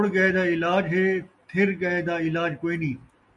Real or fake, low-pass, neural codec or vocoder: real; 10.8 kHz; none